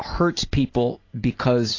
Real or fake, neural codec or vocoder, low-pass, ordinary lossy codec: real; none; 7.2 kHz; AAC, 32 kbps